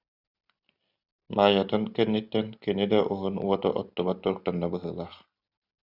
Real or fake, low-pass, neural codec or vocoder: real; 5.4 kHz; none